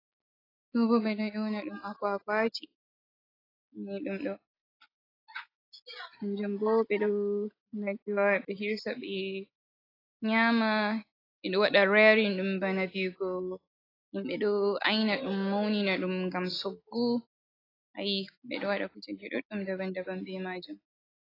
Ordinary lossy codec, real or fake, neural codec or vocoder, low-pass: AAC, 24 kbps; real; none; 5.4 kHz